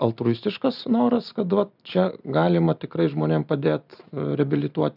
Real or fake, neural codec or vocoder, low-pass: real; none; 5.4 kHz